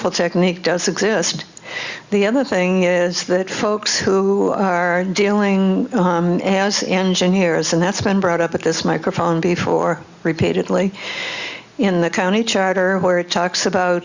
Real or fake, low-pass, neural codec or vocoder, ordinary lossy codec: real; 7.2 kHz; none; Opus, 64 kbps